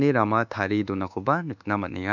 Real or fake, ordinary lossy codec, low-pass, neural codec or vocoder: fake; none; 7.2 kHz; codec, 24 kHz, 1.2 kbps, DualCodec